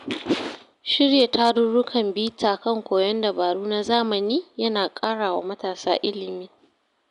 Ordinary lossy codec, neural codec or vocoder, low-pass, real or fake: none; none; 10.8 kHz; real